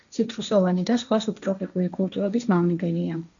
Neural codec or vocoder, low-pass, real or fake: codec, 16 kHz, 1.1 kbps, Voila-Tokenizer; 7.2 kHz; fake